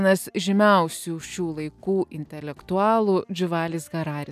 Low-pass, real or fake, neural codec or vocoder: 14.4 kHz; real; none